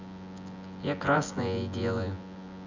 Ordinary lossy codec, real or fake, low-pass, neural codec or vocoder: MP3, 64 kbps; fake; 7.2 kHz; vocoder, 24 kHz, 100 mel bands, Vocos